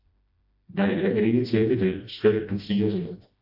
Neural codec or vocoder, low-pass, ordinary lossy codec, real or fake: codec, 16 kHz, 1 kbps, FreqCodec, smaller model; 5.4 kHz; AAC, 48 kbps; fake